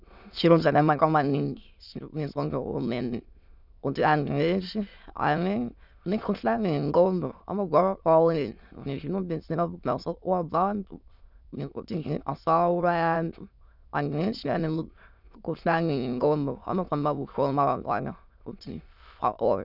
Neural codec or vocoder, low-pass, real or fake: autoencoder, 22.05 kHz, a latent of 192 numbers a frame, VITS, trained on many speakers; 5.4 kHz; fake